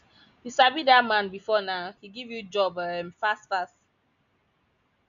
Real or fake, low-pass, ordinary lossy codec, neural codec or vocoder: real; 7.2 kHz; none; none